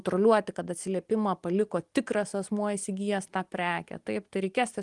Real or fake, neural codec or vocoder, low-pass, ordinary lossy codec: real; none; 10.8 kHz; Opus, 24 kbps